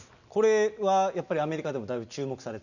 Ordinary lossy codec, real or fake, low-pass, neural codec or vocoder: none; real; 7.2 kHz; none